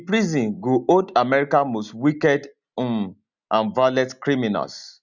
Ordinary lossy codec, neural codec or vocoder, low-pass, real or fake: none; none; 7.2 kHz; real